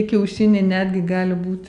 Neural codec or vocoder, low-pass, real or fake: none; 10.8 kHz; real